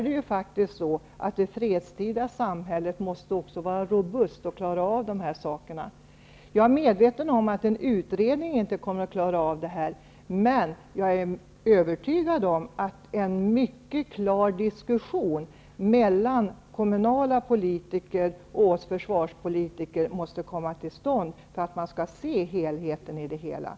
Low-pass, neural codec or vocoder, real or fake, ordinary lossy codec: none; none; real; none